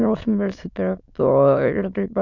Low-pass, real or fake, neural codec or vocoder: 7.2 kHz; fake; autoencoder, 22.05 kHz, a latent of 192 numbers a frame, VITS, trained on many speakers